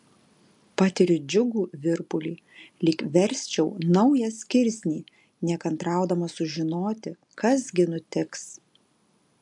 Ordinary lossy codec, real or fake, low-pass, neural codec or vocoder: MP3, 64 kbps; real; 10.8 kHz; none